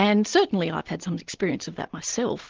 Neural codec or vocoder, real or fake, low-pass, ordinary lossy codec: none; real; 7.2 kHz; Opus, 16 kbps